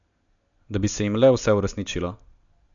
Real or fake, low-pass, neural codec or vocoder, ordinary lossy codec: real; 7.2 kHz; none; none